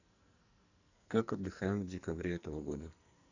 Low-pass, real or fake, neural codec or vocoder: 7.2 kHz; fake; codec, 44.1 kHz, 2.6 kbps, SNAC